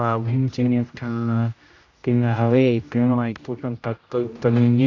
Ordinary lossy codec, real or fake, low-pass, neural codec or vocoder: AAC, 48 kbps; fake; 7.2 kHz; codec, 16 kHz, 0.5 kbps, X-Codec, HuBERT features, trained on general audio